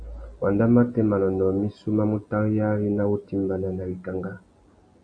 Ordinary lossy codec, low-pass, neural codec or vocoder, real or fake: AAC, 48 kbps; 9.9 kHz; none; real